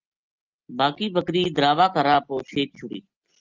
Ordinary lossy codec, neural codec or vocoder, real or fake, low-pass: Opus, 32 kbps; none; real; 7.2 kHz